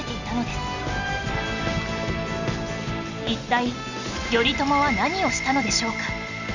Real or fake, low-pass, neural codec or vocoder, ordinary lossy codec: real; 7.2 kHz; none; Opus, 64 kbps